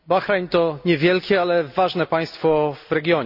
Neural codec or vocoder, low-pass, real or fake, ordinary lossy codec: none; 5.4 kHz; real; AAC, 48 kbps